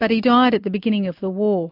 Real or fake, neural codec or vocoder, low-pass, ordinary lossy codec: real; none; 5.4 kHz; MP3, 48 kbps